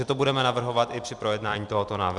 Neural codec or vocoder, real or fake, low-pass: vocoder, 44.1 kHz, 128 mel bands every 256 samples, BigVGAN v2; fake; 10.8 kHz